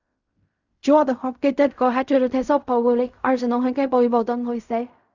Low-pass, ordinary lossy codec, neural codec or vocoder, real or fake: 7.2 kHz; Opus, 64 kbps; codec, 16 kHz in and 24 kHz out, 0.4 kbps, LongCat-Audio-Codec, fine tuned four codebook decoder; fake